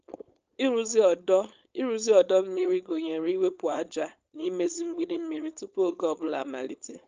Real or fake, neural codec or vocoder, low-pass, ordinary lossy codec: fake; codec, 16 kHz, 4.8 kbps, FACodec; 7.2 kHz; Opus, 24 kbps